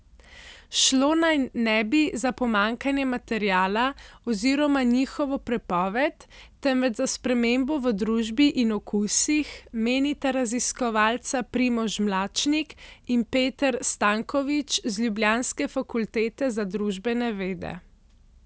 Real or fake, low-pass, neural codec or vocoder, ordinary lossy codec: real; none; none; none